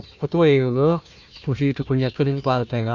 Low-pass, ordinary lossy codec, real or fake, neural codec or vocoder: 7.2 kHz; none; fake; codec, 16 kHz, 1 kbps, FunCodec, trained on Chinese and English, 50 frames a second